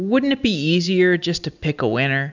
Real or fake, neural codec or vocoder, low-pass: real; none; 7.2 kHz